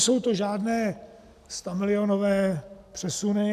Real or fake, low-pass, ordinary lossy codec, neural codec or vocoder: real; 14.4 kHz; AAC, 96 kbps; none